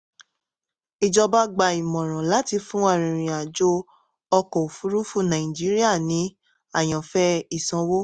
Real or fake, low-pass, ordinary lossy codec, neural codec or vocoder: real; 9.9 kHz; Opus, 64 kbps; none